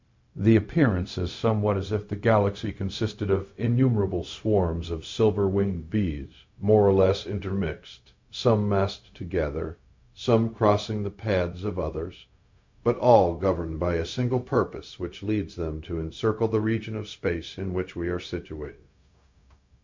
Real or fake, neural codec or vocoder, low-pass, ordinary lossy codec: fake; codec, 16 kHz, 0.4 kbps, LongCat-Audio-Codec; 7.2 kHz; MP3, 48 kbps